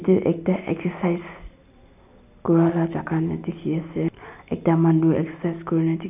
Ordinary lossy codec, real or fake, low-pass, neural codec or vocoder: none; real; 3.6 kHz; none